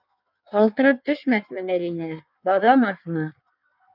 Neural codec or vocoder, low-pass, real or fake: codec, 16 kHz in and 24 kHz out, 1.1 kbps, FireRedTTS-2 codec; 5.4 kHz; fake